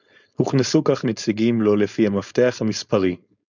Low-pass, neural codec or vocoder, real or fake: 7.2 kHz; codec, 16 kHz, 4.8 kbps, FACodec; fake